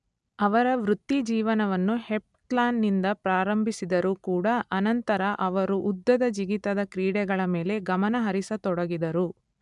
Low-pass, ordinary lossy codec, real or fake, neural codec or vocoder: 10.8 kHz; none; real; none